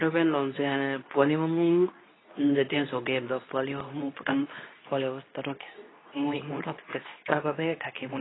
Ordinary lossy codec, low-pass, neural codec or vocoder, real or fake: AAC, 16 kbps; 7.2 kHz; codec, 24 kHz, 0.9 kbps, WavTokenizer, medium speech release version 2; fake